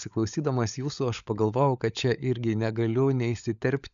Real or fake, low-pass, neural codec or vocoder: fake; 7.2 kHz; codec, 16 kHz, 16 kbps, FunCodec, trained on LibriTTS, 50 frames a second